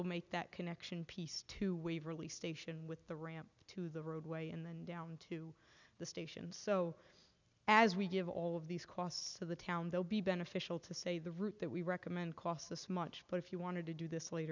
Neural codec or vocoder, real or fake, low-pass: none; real; 7.2 kHz